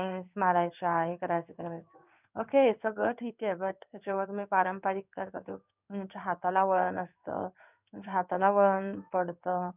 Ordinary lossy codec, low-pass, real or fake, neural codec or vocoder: none; 3.6 kHz; fake; codec, 16 kHz in and 24 kHz out, 1 kbps, XY-Tokenizer